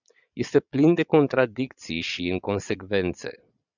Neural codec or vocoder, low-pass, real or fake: vocoder, 22.05 kHz, 80 mel bands, Vocos; 7.2 kHz; fake